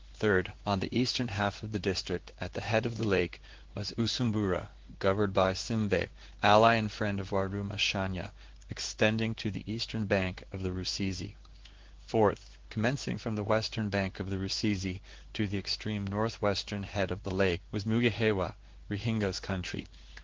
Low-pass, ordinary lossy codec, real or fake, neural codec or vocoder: 7.2 kHz; Opus, 16 kbps; fake; codec, 16 kHz in and 24 kHz out, 1 kbps, XY-Tokenizer